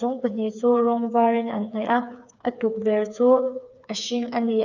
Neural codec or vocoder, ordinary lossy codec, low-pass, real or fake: codec, 16 kHz, 4 kbps, FreqCodec, smaller model; none; 7.2 kHz; fake